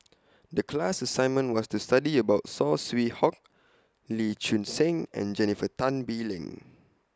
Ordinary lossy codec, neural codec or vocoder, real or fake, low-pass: none; none; real; none